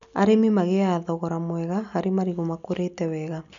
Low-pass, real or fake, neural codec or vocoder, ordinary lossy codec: 7.2 kHz; real; none; none